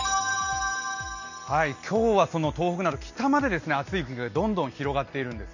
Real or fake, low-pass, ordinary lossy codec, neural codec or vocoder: real; 7.2 kHz; none; none